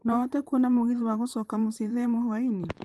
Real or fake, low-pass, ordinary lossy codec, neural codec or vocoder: fake; 14.4 kHz; Opus, 32 kbps; vocoder, 44.1 kHz, 128 mel bands, Pupu-Vocoder